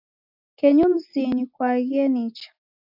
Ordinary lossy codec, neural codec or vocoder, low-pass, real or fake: AAC, 48 kbps; none; 5.4 kHz; real